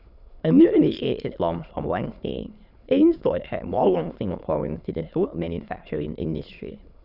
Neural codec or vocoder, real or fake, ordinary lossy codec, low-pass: autoencoder, 22.05 kHz, a latent of 192 numbers a frame, VITS, trained on many speakers; fake; none; 5.4 kHz